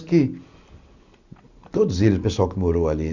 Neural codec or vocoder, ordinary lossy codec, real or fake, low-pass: none; none; real; 7.2 kHz